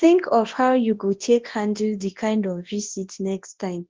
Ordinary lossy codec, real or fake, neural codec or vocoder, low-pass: Opus, 16 kbps; fake; codec, 24 kHz, 0.9 kbps, WavTokenizer, large speech release; 7.2 kHz